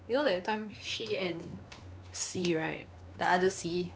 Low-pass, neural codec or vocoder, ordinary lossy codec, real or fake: none; codec, 16 kHz, 2 kbps, X-Codec, WavLM features, trained on Multilingual LibriSpeech; none; fake